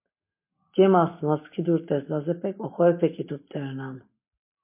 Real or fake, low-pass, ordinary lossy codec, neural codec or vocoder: real; 3.6 kHz; MP3, 24 kbps; none